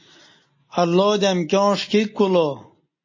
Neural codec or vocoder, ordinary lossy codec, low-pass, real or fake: none; MP3, 32 kbps; 7.2 kHz; real